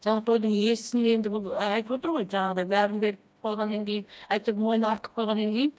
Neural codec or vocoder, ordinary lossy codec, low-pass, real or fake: codec, 16 kHz, 1 kbps, FreqCodec, smaller model; none; none; fake